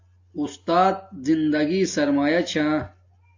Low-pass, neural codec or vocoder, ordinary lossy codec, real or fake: 7.2 kHz; none; AAC, 48 kbps; real